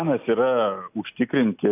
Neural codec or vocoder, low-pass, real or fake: none; 3.6 kHz; real